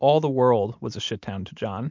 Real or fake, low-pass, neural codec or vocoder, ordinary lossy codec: real; 7.2 kHz; none; MP3, 64 kbps